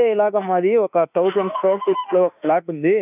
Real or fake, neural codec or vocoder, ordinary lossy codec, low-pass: fake; autoencoder, 48 kHz, 32 numbers a frame, DAC-VAE, trained on Japanese speech; none; 3.6 kHz